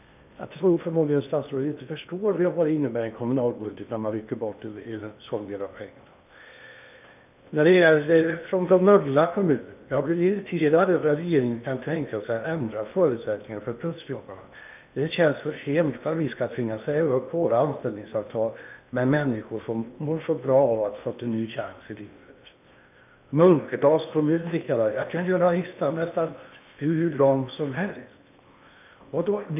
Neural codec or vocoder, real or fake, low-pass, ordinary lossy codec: codec, 16 kHz in and 24 kHz out, 0.8 kbps, FocalCodec, streaming, 65536 codes; fake; 3.6 kHz; none